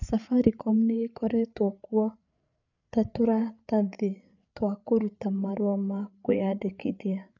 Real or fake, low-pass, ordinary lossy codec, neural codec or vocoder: fake; 7.2 kHz; none; codec, 16 kHz, 8 kbps, FreqCodec, larger model